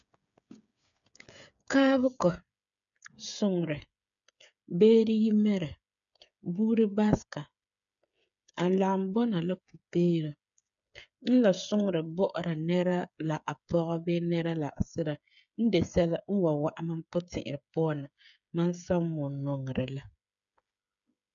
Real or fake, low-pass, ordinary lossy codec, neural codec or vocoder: fake; 7.2 kHz; none; codec, 16 kHz, 8 kbps, FreqCodec, smaller model